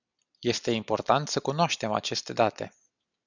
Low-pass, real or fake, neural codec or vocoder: 7.2 kHz; real; none